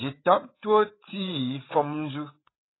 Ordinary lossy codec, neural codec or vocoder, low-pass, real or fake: AAC, 16 kbps; codec, 16 kHz, 8 kbps, FreqCodec, larger model; 7.2 kHz; fake